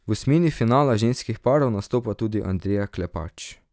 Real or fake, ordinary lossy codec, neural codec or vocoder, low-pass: real; none; none; none